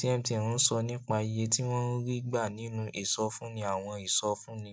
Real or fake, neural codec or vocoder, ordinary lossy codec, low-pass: real; none; none; none